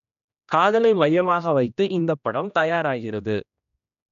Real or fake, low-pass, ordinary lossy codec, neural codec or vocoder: fake; 7.2 kHz; none; codec, 16 kHz, 1 kbps, X-Codec, HuBERT features, trained on general audio